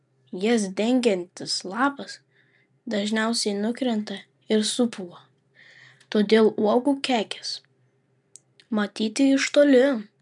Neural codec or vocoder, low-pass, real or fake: none; 10.8 kHz; real